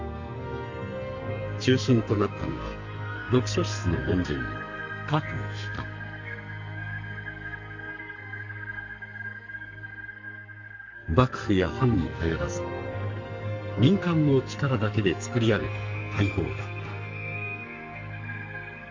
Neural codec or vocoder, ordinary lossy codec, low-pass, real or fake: codec, 44.1 kHz, 2.6 kbps, SNAC; Opus, 32 kbps; 7.2 kHz; fake